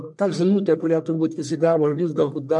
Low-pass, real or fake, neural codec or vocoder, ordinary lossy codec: 10.8 kHz; fake; codec, 44.1 kHz, 1.7 kbps, Pupu-Codec; MP3, 64 kbps